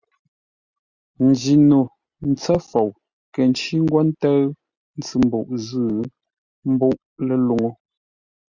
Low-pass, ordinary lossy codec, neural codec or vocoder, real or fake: 7.2 kHz; Opus, 64 kbps; none; real